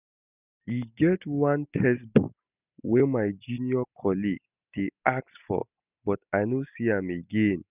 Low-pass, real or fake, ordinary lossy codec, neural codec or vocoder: 3.6 kHz; real; none; none